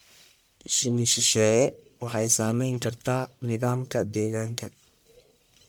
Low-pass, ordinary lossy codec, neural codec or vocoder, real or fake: none; none; codec, 44.1 kHz, 1.7 kbps, Pupu-Codec; fake